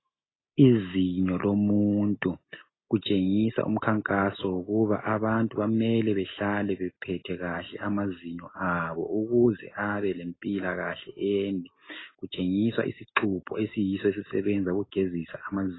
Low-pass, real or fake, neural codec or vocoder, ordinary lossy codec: 7.2 kHz; real; none; AAC, 16 kbps